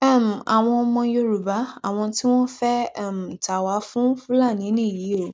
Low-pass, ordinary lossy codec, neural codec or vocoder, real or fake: none; none; none; real